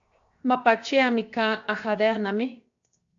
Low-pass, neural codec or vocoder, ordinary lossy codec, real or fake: 7.2 kHz; codec, 16 kHz, 0.7 kbps, FocalCodec; AAC, 48 kbps; fake